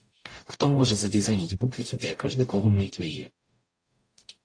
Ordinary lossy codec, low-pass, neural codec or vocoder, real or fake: AAC, 48 kbps; 9.9 kHz; codec, 44.1 kHz, 0.9 kbps, DAC; fake